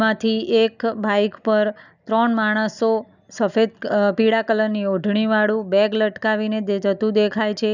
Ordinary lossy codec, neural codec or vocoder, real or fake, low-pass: none; none; real; 7.2 kHz